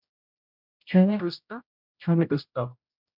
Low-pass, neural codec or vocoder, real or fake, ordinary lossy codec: 5.4 kHz; codec, 16 kHz, 0.5 kbps, X-Codec, HuBERT features, trained on general audio; fake; MP3, 48 kbps